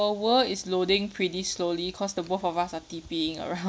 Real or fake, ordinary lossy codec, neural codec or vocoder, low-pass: real; none; none; none